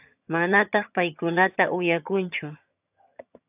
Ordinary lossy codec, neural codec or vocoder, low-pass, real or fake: AAC, 32 kbps; vocoder, 22.05 kHz, 80 mel bands, HiFi-GAN; 3.6 kHz; fake